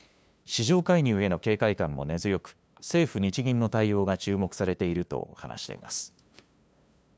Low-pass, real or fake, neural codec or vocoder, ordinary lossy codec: none; fake; codec, 16 kHz, 2 kbps, FunCodec, trained on LibriTTS, 25 frames a second; none